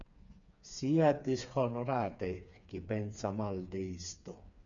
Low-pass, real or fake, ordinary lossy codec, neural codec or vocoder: 7.2 kHz; fake; MP3, 96 kbps; codec, 16 kHz, 4 kbps, FreqCodec, smaller model